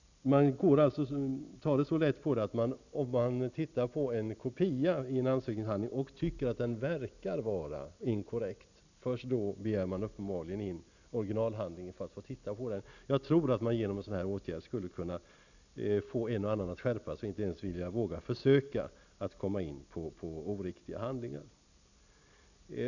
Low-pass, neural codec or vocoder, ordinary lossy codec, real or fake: 7.2 kHz; none; none; real